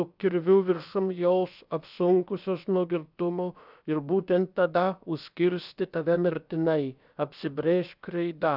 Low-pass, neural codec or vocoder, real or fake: 5.4 kHz; codec, 16 kHz, about 1 kbps, DyCAST, with the encoder's durations; fake